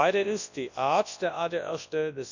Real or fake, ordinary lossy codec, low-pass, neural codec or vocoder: fake; none; 7.2 kHz; codec, 24 kHz, 0.9 kbps, WavTokenizer, large speech release